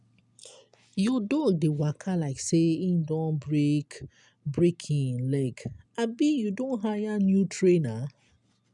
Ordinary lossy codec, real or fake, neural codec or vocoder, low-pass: none; real; none; 10.8 kHz